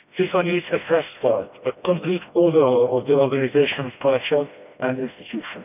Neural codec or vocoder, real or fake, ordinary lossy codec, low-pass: codec, 16 kHz, 1 kbps, FreqCodec, smaller model; fake; none; 3.6 kHz